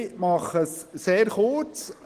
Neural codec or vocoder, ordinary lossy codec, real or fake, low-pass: none; Opus, 16 kbps; real; 14.4 kHz